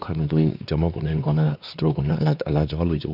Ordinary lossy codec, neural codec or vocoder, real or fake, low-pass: none; codec, 16 kHz, 2 kbps, X-Codec, WavLM features, trained on Multilingual LibriSpeech; fake; 5.4 kHz